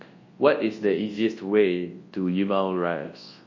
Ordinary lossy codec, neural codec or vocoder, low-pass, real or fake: MP3, 32 kbps; codec, 24 kHz, 0.9 kbps, WavTokenizer, large speech release; 7.2 kHz; fake